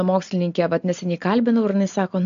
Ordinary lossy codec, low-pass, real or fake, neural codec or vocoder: MP3, 64 kbps; 7.2 kHz; real; none